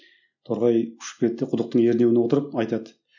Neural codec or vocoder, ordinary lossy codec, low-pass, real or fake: none; none; 7.2 kHz; real